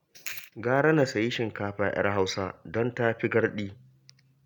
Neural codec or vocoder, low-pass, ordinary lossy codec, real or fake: none; none; none; real